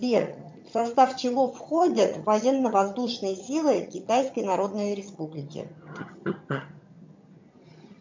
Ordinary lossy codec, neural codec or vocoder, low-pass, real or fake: MP3, 64 kbps; vocoder, 22.05 kHz, 80 mel bands, HiFi-GAN; 7.2 kHz; fake